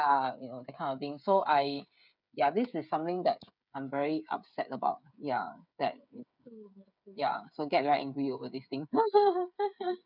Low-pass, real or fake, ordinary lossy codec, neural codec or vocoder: 5.4 kHz; fake; none; codec, 16 kHz, 8 kbps, FreqCodec, smaller model